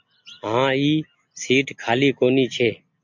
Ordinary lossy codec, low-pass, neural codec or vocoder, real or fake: MP3, 64 kbps; 7.2 kHz; none; real